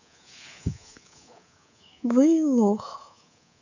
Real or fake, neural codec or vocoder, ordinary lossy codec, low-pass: fake; codec, 24 kHz, 3.1 kbps, DualCodec; none; 7.2 kHz